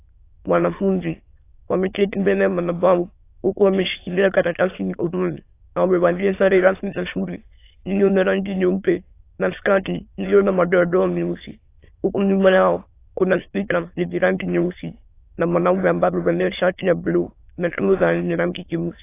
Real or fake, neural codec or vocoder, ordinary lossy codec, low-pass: fake; autoencoder, 22.05 kHz, a latent of 192 numbers a frame, VITS, trained on many speakers; AAC, 24 kbps; 3.6 kHz